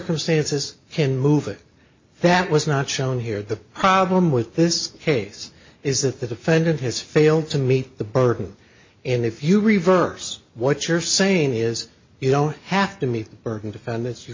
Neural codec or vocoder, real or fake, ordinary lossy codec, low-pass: none; real; MP3, 32 kbps; 7.2 kHz